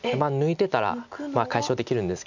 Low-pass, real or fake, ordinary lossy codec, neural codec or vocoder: 7.2 kHz; real; none; none